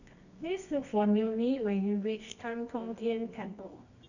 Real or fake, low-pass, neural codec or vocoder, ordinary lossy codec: fake; 7.2 kHz; codec, 24 kHz, 0.9 kbps, WavTokenizer, medium music audio release; none